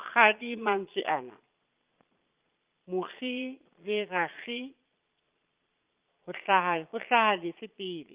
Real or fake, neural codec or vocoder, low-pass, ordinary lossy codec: fake; vocoder, 22.05 kHz, 80 mel bands, Vocos; 3.6 kHz; Opus, 32 kbps